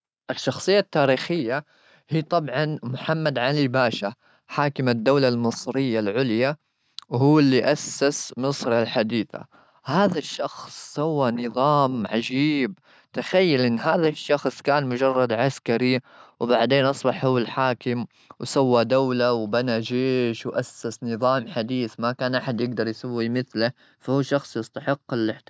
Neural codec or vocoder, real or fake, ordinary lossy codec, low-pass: none; real; none; none